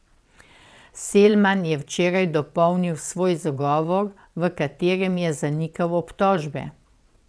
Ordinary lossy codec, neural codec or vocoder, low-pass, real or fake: none; vocoder, 22.05 kHz, 80 mel bands, WaveNeXt; none; fake